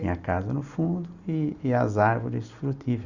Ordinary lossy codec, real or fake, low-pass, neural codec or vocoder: Opus, 64 kbps; real; 7.2 kHz; none